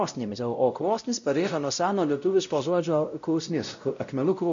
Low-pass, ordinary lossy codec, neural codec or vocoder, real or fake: 7.2 kHz; MP3, 64 kbps; codec, 16 kHz, 0.5 kbps, X-Codec, WavLM features, trained on Multilingual LibriSpeech; fake